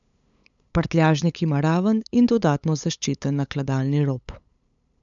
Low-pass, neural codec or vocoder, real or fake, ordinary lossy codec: 7.2 kHz; codec, 16 kHz, 8 kbps, FunCodec, trained on LibriTTS, 25 frames a second; fake; none